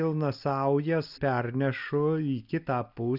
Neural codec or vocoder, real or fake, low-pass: none; real; 5.4 kHz